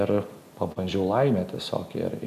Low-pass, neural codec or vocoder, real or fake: 14.4 kHz; none; real